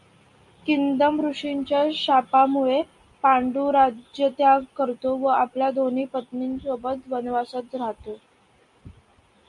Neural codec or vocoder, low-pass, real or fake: none; 10.8 kHz; real